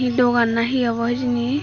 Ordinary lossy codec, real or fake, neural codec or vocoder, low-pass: Opus, 64 kbps; real; none; 7.2 kHz